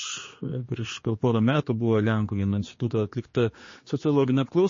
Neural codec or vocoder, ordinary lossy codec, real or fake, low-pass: codec, 16 kHz, 2 kbps, X-Codec, HuBERT features, trained on general audio; MP3, 32 kbps; fake; 7.2 kHz